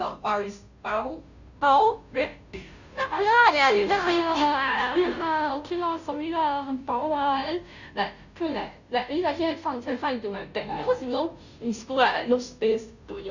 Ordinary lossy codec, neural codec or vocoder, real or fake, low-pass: none; codec, 16 kHz, 0.5 kbps, FunCodec, trained on Chinese and English, 25 frames a second; fake; 7.2 kHz